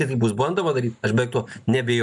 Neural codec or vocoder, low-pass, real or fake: none; 10.8 kHz; real